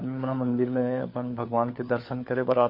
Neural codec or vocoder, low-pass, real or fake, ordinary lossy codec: codec, 16 kHz, 4 kbps, FunCodec, trained on LibriTTS, 50 frames a second; 5.4 kHz; fake; MP3, 32 kbps